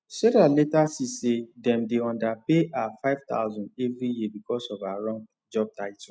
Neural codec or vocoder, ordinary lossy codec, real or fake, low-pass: none; none; real; none